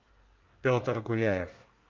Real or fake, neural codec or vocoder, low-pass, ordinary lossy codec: fake; codec, 24 kHz, 1 kbps, SNAC; 7.2 kHz; Opus, 24 kbps